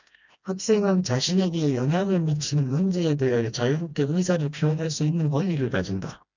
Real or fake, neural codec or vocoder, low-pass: fake; codec, 16 kHz, 1 kbps, FreqCodec, smaller model; 7.2 kHz